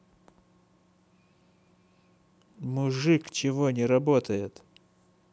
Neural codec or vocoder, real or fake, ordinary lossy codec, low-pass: none; real; none; none